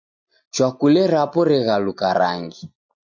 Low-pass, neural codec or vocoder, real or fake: 7.2 kHz; none; real